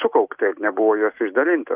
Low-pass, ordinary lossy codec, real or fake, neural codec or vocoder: 3.6 kHz; Opus, 24 kbps; real; none